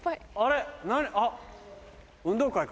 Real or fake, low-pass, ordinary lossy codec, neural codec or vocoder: real; none; none; none